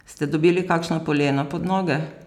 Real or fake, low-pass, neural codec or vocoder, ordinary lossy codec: real; 19.8 kHz; none; none